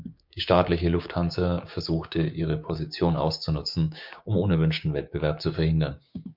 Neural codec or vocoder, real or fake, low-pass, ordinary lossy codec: codec, 16 kHz, 6 kbps, DAC; fake; 5.4 kHz; MP3, 48 kbps